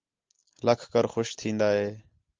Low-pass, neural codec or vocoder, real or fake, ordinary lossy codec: 7.2 kHz; none; real; Opus, 24 kbps